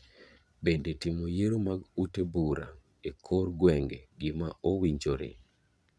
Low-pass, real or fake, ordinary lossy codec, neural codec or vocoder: none; fake; none; vocoder, 22.05 kHz, 80 mel bands, Vocos